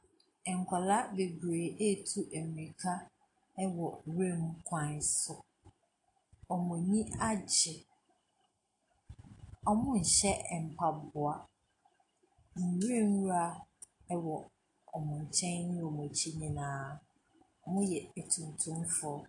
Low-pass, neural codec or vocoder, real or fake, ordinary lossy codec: 10.8 kHz; none; real; AAC, 48 kbps